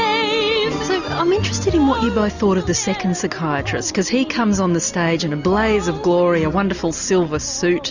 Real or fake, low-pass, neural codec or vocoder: real; 7.2 kHz; none